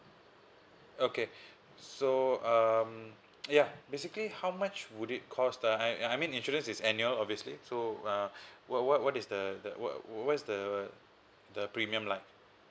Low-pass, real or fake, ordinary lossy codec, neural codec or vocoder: none; real; none; none